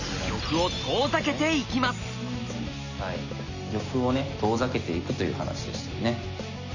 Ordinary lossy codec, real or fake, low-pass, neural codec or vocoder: none; real; 7.2 kHz; none